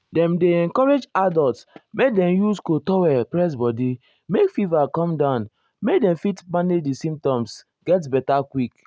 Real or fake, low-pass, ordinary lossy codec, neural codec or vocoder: real; none; none; none